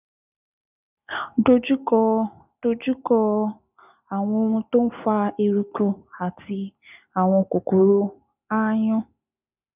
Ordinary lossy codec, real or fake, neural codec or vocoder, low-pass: none; real; none; 3.6 kHz